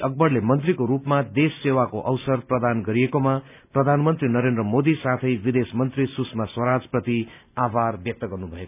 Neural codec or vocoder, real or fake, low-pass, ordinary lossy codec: none; real; 3.6 kHz; none